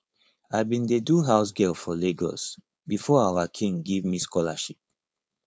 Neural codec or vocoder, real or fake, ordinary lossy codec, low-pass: codec, 16 kHz, 4.8 kbps, FACodec; fake; none; none